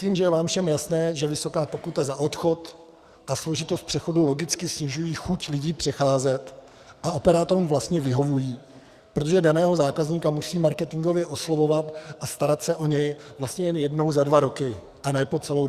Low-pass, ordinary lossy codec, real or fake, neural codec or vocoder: 14.4 kHz; Opus, 64 kbps; fake; codec, 44.1 kHz, 2.6 kbps, SNAC